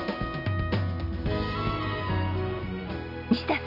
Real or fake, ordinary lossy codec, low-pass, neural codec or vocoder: real; none; 5.4 kHz; none